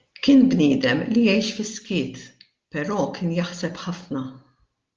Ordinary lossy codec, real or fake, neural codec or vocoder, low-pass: Opus, 32 kbps; real; none; 7.2 kHz